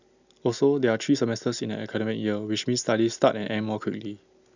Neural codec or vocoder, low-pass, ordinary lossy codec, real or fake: none; 7.2 kHz; none; real